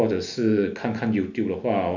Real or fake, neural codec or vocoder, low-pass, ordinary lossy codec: real; none; 7.2 kHz; none